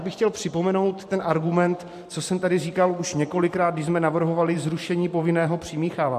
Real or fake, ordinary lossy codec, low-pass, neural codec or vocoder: fake; MP3, 64 kbps; 14.4 kHz; vocoder, 44.1 kHz, 128 mel bands every 512 samples, BigVGAN v2